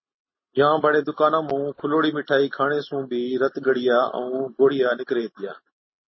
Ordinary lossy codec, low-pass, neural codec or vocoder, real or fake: MP3, 24 kbps; 7.2 kHz; none; real